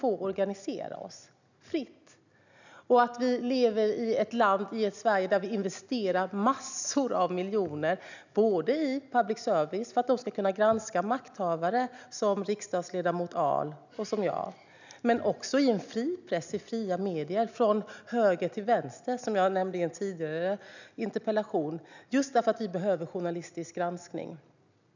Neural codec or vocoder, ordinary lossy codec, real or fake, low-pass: none; none; real; 7.2 kHz